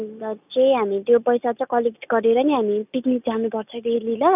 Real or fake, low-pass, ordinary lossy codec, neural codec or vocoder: real; 3.6 kHz; none; none